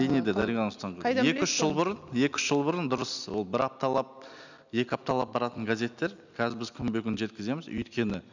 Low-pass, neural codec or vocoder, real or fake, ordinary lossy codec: 7.2 kHz; none; real; none